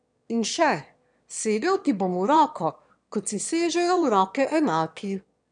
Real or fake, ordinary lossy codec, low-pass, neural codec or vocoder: fake; none; 9.9 kHz; autoencoder, 22.05 kHz, a latent of 192 numbers a frame, VITS, trained on one speaker